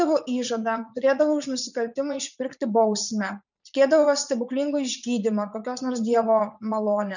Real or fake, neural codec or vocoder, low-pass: fake; vocoder, 44.1 kHz, 80 mel bands, Vocos; 7.2 kHz